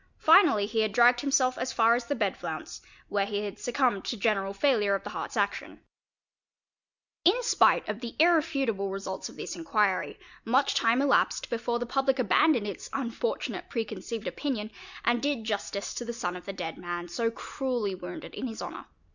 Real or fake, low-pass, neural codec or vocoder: real; 7.2 kHz; none